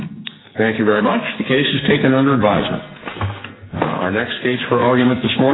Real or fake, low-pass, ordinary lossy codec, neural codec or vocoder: fake; 7.2 kHz; AAC, 16 kbps; codec, 44.1 kHz, 2.6 kbps, SNAC